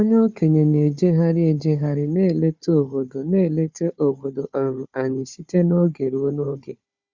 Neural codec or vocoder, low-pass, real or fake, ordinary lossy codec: codec, 16 kHz, 4 kbps, FunCodec, trained on Chinese and English, 50 frames a second; 7.2 kHz; fake; Opus, 64 kbps